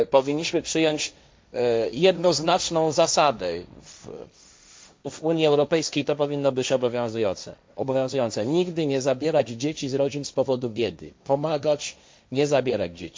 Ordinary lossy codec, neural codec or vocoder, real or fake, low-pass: none; codec, 16 kHz, 1.1 kbps, Voila-Tokenizer; fake; none